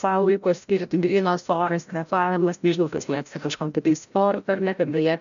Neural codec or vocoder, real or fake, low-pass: codec, 16 kHz, 0.5 kbps, FreqCodec, larger model; fake; 7.2 kHz